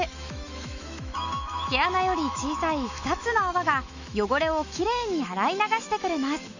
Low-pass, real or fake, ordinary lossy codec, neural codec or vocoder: 7.2 kHz; fake; none; autoencoder, 48 kHz, 128 numbers a frame, DAC-VAE, trained on Japanese speech